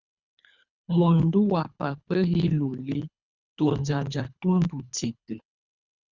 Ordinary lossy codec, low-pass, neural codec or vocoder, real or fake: Opus, 64 kbps; 7.2 kHz; codec, 24 kHz, 3 kbps, HILCodec; fake